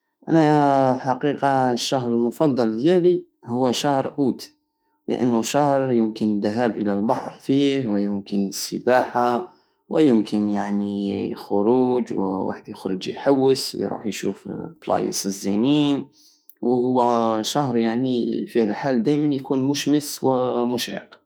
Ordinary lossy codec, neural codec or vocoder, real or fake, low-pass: none; autoencoder, 48 kHz, 32 numbers a frame, DAC-VAE, trained on Japanese speech; fake; none